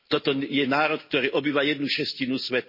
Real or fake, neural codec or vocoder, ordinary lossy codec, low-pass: real; none; MP3, 24 kbps; 5.4 kHz